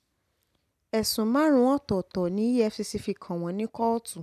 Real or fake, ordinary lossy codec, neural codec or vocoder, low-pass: real; none; none; 14.4 kHz